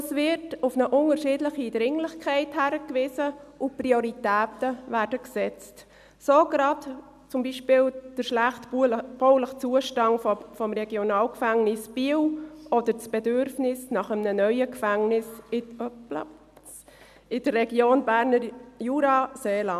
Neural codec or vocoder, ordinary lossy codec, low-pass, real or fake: none; none; 14.4 kHz; real